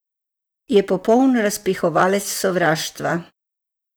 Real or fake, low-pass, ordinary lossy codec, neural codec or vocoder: fake; none; none; vocoder, 44.1 kHz, 128 mel bands, Pupu-Vocoder